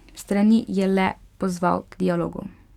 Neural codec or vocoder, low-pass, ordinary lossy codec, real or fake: codec, 44.1 kHz, 7.8 kbps, DAC; 19.8 kHz; Opus, 64 kbps; fake